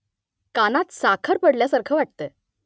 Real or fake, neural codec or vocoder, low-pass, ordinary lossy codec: real; none; none; none